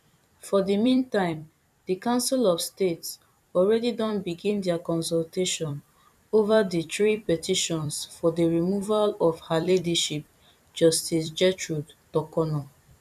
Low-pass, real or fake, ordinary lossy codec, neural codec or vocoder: 14.4 kHz; fake; none; vocoder, 44.1 kHz, 128 mel bands every 256 samples, BigVGAN v2